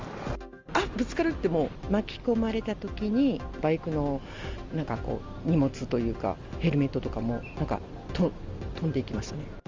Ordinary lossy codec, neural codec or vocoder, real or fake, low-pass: Opus, 32 kbps; none; real; 7.2 kHz